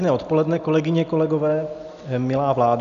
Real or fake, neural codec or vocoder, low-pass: real; none; 7.2 kHz